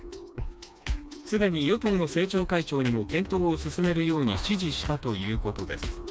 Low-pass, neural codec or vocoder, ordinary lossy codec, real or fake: none; codec, 16 kHz, 2 kbps, FreqCodec, smaller model; none; fake